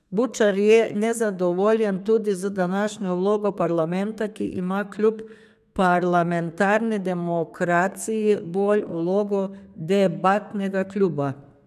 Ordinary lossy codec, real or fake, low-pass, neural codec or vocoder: none; fake; 14.4 kHz; codec, 32 kHz, 1.9 kbps, SNAC